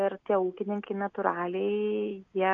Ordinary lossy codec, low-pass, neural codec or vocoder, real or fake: AAC, 64 kbps; 7.2 kHz; none; real